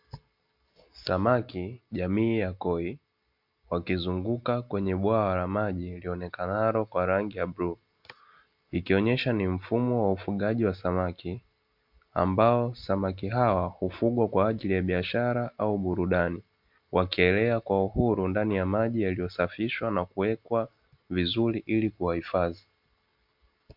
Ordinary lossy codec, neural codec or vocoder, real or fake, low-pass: MP3, 48 kbps; none; real; 5.4 kHz